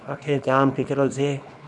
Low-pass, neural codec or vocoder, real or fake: 10.8 kHz; codec, 24 kHz, 0.9 kbps, WavTokenizer, small release; fake